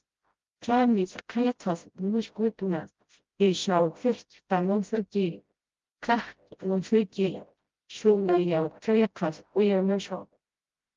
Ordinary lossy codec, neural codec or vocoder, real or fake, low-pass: Opus, 32 kbps; codec, 16 kHz, 0.5 kbps, FreqCodec, smaller model; fake; 7.2 kHz